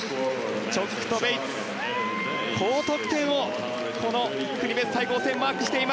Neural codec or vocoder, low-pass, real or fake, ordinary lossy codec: none; none; real; none